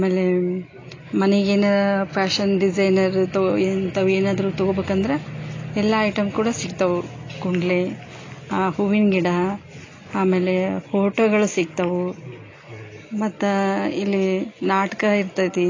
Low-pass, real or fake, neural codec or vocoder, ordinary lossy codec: 7.2 kHz; real; none; AAC, 32 kbps